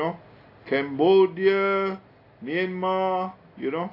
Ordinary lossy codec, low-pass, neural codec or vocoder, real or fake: AAC, 32 kbps; 5.4 kHz; none; real